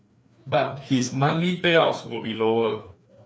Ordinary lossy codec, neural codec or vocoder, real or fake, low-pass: none; codec, 16 kHz, 2 kbps, FreqCodec, larger model; fake; none